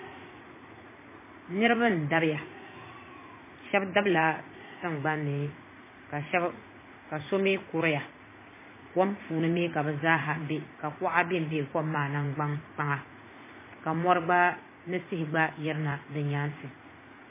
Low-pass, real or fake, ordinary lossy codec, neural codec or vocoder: 3.6 kHz; fake; MP3, 16 kbps; vocoder, 44.1 kHz, 80 mel bands, Vocos